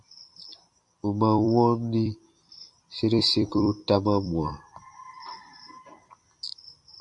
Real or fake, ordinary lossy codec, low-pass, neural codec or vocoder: fake; MP3, 64 kbps; 10.8 kHz; vocoder, 44.1 kHz, 128 mel bands every 256 samples, BigVGAN v2